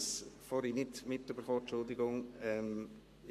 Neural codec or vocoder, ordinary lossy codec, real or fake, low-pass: codec, 44.1 kHz, 7.8 kbps, Pupu-Codec; MP3, 64 kbps; fake; 14.4 kHz